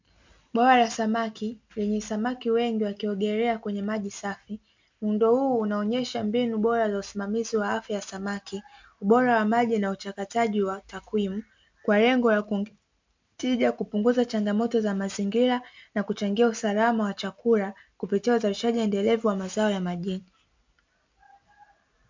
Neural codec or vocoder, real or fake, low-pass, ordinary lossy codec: none; real; 7.2 kHz; MP3, 64 kbps